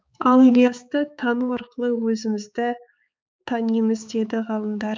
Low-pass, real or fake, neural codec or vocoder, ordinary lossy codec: none; fake; codec, 16 kHz, 4 kbps, X-Codec, HuBERT features, trained on balanced general audio; none